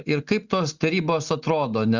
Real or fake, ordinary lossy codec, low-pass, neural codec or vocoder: real; Opus, 64 kbps; 7.2 kHz; none